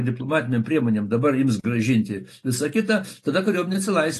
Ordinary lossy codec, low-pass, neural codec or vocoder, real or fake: AAC, 48 kbps; 14.4 kHz; none; real